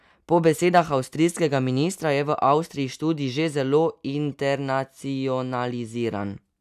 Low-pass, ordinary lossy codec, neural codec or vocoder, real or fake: 14.4 kHz; none; none; real